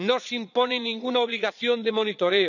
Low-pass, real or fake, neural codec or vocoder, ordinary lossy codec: 7.2 kHz; fake; vocoder, 22.05 kHz, 80 mel bands, Vocos; none